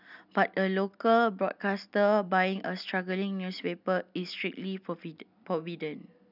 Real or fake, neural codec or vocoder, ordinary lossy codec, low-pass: real; none; none; 5.4 kHz